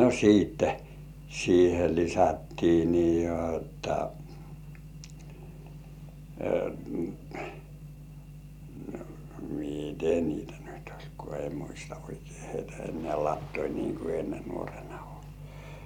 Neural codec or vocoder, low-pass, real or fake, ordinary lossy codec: none; 19.8 kHz; real; none